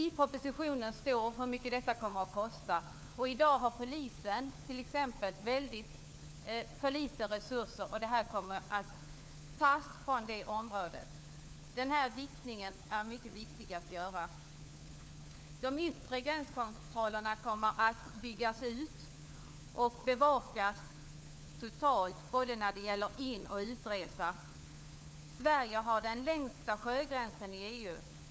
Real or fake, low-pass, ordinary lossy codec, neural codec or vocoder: fake; none; none; codec, 16 kHz, 4 kbps, FunCodec, trained on LibriTTS, 50 frames a second